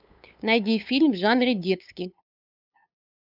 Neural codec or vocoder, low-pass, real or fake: codec, 16 kHz, 8 kbps, FunCodec, trained on LibriTTS, 25 frames a second; 5.4 kHz; fake